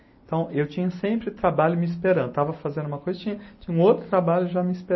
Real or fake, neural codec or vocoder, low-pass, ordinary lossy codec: real; none; 7.2 kHz; MP3, 24 kbps